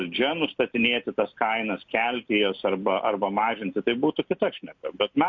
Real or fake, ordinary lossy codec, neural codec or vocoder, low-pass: real; MP3, 48 kbps; none; 7.2 kHz